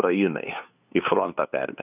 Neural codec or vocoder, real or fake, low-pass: codec, 16 kHz, 2 kbps, FunCodec, trained on LibriTTS, 25 frames a second; fake; 3.6 kHz